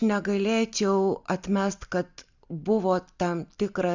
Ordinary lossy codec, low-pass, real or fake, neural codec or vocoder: Opus, 64 kbps; 7.2 kHz; real; none